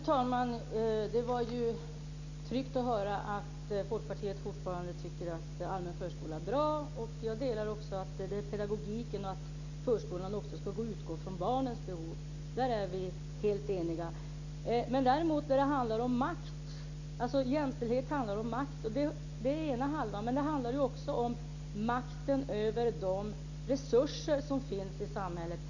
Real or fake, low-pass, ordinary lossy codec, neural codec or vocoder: real; 7.2 kHz; none; none